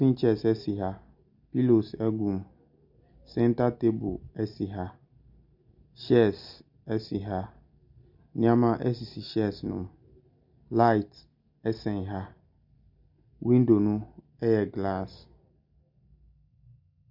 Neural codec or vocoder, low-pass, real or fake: none; 5.4 kHz; real